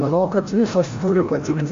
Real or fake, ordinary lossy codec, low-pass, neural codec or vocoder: fake; MP3, 48 kbps; 7.2 kHz; codec, 16 kHz, 0.5 kbps, FreqCodec, larger model